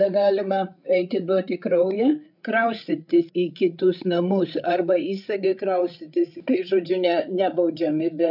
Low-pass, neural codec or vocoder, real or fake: 5.4 kHz; codec, 16 kHz, 8 kbps, FreqCodec, larger model; fake